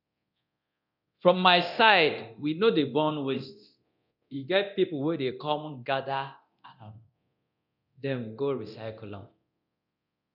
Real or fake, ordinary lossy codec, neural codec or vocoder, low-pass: fake; none; codec, 24 kHz, 0.9 kbps, DualCodec; 5.4 kHz